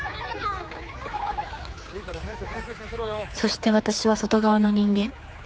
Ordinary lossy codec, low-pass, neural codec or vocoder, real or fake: none; none; codec, 16 kHz, 4 kbps, X-Codec, HuBERT features, trained on general audio; fake